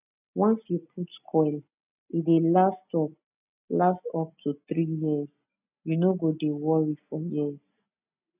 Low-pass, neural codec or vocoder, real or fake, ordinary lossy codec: 3.6 kHz; none; real; none